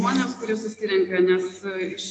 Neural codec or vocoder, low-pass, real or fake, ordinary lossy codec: none; 10.8 kHz; real; AAC, 48 kbps